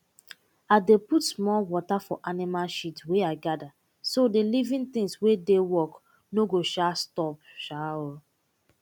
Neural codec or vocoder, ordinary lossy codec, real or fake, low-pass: none; none; real; 19.8 kHz